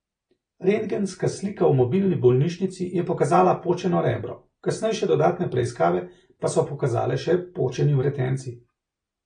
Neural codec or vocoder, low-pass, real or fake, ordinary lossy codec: none; 9.9 kHz; real; AAC, 32 kbps